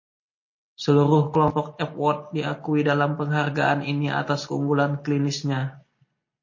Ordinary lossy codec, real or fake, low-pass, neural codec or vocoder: MP3, 32 kbps; real; 7.2 kHz; none